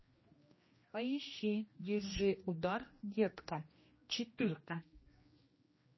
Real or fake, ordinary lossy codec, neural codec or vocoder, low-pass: fake; MP3, 24 kbps; codec, 16 kHz, 1 kbps, X-Codec, HuBERT features, trained on general audio; 7.2 kHz